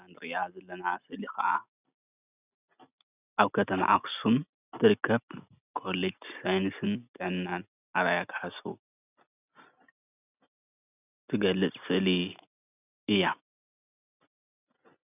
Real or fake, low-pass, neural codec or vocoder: real; 3.6 kHz; none